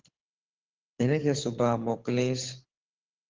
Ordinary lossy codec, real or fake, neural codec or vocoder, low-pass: Opus, 16 kbps; fake; codec, 24 kHz, 6 kbps, HILCodec; 7.2 kHz